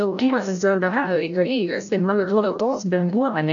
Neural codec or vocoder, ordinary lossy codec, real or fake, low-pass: codec, 16 kHz, 0.5 kbps, FreqCodec, larger model; AAC, 64 kbps; fake; 7.2 kHz